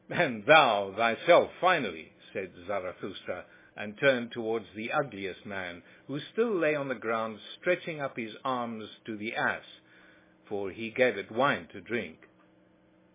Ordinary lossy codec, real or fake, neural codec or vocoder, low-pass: MP3, 16 kbps; real; none; 3.6 kHz